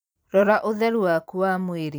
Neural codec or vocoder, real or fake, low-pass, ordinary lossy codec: vocoder, 44.1 kHz, 128 mel bands every 256 samples, BigVGAN v2; fake; none; none